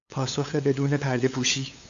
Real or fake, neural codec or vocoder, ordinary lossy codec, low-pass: fake; codec, 16 kHz, 8 kbps, FunCodec, trained on LibriTTS, 25 frames a second; AAC, 64 kbps; 7.2 kHz